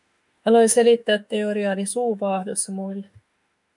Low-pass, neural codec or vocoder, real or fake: 10.8 kHz; autoencoder, 48 kHz, 32 numbers a frame, DAC-VAE, trained on Japanese speech; fake